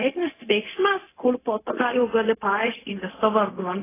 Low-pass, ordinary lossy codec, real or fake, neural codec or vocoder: 3.6 kHz; AAC, 16 kbps; fake; codec, 16 kHz, 0.4 kbps, LongCat-Audio-Codec